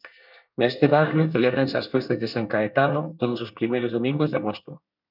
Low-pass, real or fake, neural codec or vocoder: 5.4 kHz; fake; codec, 24 kHz, 1 kbps, SNAC